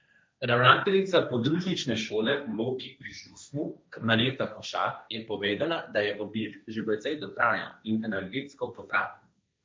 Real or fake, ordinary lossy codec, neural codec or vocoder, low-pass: fake; none; codec, 16 kHz, 1.1 kbps, Voila-Tokenizer; none